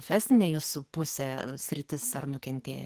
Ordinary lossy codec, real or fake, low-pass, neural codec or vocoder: Opus, 24 kbps; fake; 14.4 kHz; codec, 44.1 kHz, 2.6 kbps, SNAC